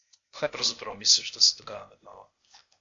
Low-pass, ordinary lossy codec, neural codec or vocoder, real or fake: 7.2 kHz; AAC, 48 kbps; codec, 16 kHz, 0.8 kbps, ZipCodec; fake